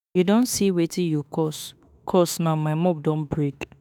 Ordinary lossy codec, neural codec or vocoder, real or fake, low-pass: none; autoencoder, 48 kHz, 32 numbers a frame, DAC-VAE, trained on Japanese speech; fake; none